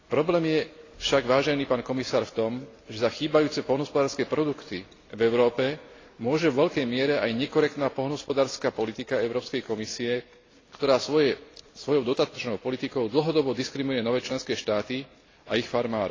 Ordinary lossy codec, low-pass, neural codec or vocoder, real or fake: AAC, 32 kbps; 7.2 kHz; none; real